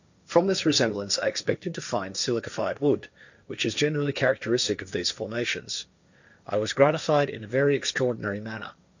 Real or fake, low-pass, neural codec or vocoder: fake; 7.2 kHz; codec, 16 kHz, 1.1 kbps, Voila-Tokenizer